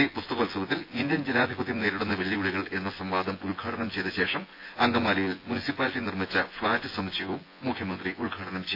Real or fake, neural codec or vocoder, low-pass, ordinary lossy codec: fake; vocoder, 24 kHz, 100 mel bands, Vocos; 5.4 kHz; AAC, 32 kbps